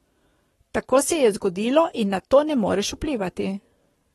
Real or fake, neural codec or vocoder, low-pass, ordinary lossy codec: fake; codec, 44.1 kHz, 7.8 kbps, Pupu-Codec; 19.8 kHz; AAC, 32 kbps